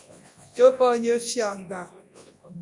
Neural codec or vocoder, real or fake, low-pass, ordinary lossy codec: codec, 24 kHz, 0.9 kbps, WavTokenizer, large speech release; fake; 10.8 kHz; Opus, 64 kbps